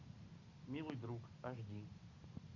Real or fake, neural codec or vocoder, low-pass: real; none; 7.2 kHz